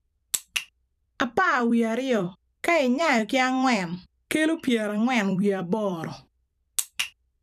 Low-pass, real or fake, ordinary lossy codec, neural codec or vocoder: 14.4 kHz; fake; none; vocoder, 44.1 kHz, 128 mel bands every 256 samples, BigVGAN v2